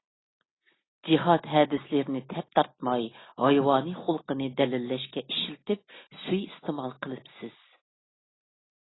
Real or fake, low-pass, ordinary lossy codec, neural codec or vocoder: real; 7.2 kHz; AAC, 16 kbps; none